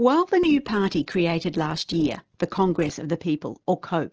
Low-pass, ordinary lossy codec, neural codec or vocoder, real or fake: 7.2 kHz; Opus, 16 kbps; none; real